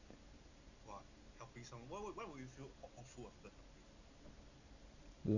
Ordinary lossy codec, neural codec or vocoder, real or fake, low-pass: none; none; real; 7.2 kHz